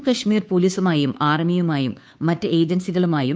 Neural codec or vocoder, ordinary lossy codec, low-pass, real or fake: codec, 16 kHz, 2 kbps, FunCodec, trained on Chinese and English, 25 frames a second; none; none; fake